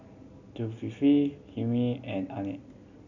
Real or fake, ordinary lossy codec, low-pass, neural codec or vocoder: real; none; 7.2 kHz; none